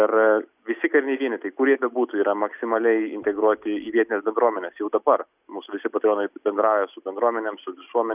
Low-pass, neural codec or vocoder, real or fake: 3.6 kHz; none; real